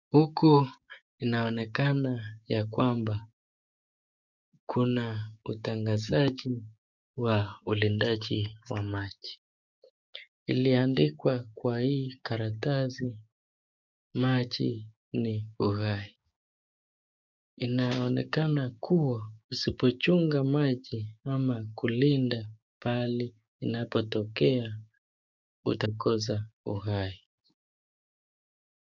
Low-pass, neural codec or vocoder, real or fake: 7.2 kHz; codec, 16 kHz, 6 kbps, DAC; fake